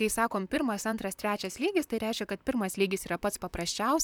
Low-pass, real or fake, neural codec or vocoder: 19.8 kHz; fake; vocoder, 44.1 kHz, 128 mel bands, Pupu-Vocoder